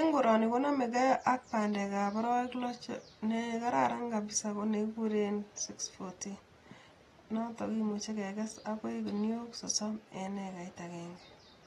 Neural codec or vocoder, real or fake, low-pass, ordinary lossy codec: none; real; 19.8 kHz; AAC, 32 kbps